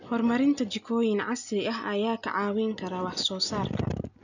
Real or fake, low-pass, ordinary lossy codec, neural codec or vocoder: real; 7.2 kHz; none; none